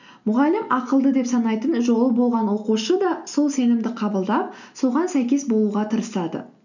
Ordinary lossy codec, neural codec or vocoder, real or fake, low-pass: none; none; real; 7.2 kHz